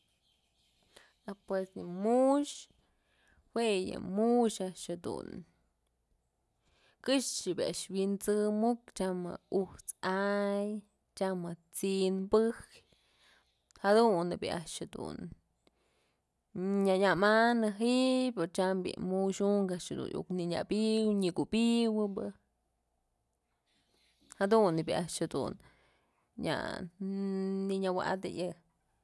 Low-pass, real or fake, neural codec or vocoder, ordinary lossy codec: none; real; none; none